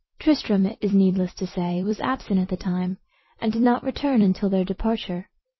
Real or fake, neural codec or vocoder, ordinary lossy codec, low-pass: fake; vocoder, 44.1 kHz, 128 mel bands every 256 samples, BigVGAN v2; MP3, 24 kbps; 7.2 kHz